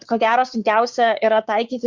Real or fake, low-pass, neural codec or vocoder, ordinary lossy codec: fake; 7.2 kHz; codec, 16 kHz, 6 kbps, DAC; Opus, 64 kbps